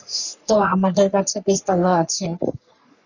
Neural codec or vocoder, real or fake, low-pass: codec, 44.1 kHz, 3.4 kbps, Pupu-Codec; fake; 7.2 kHz